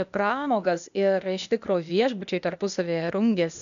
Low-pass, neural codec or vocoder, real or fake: 7.2 kHz; codec, 16 kHz, 0.8 kbps, ZipCodec; fake